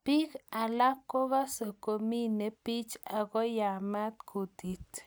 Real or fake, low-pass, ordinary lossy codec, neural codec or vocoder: real; none; none; none